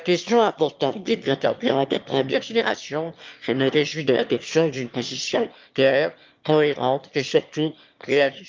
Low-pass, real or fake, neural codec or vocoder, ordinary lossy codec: 7.2 kHz; fake; autoencoder, 22.05 kHz, a latent of 192 numbers a frame, VITS, trained on one speaker; Opus, 24 kbps